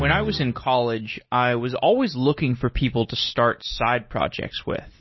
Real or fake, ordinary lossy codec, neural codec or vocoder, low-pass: real; MP3, 24 kbps; none; 7.2 kHz